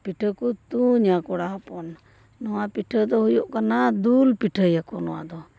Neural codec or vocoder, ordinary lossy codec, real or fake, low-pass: none; none; real; none